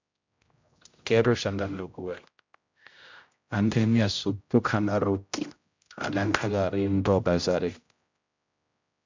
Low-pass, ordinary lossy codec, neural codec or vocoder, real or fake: 7.2 kHz; MP3, 64 kbps; codec, 16 kHz, 0.5 kbps, X-Codec, HuBERT features, trained on general audio; fake